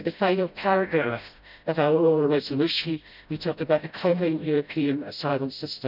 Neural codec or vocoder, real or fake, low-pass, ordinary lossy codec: codec, 16 kHz, 0.5 kbps, FreqCodec, smaller model; fake; 5.4 kHz; none